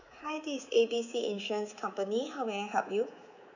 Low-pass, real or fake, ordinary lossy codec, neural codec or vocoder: 7.2 kHz; fake; none; codec, 24 kHz, 3.1 kbps, DualCodec